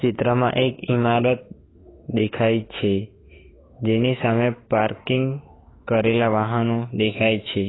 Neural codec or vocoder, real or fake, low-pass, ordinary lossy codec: autoencoder, 48 kHz, 32 numbers a frame, DAC-VAE, trained on Japanese speech; fake; 7.2 kHz; AAC, 16 kbps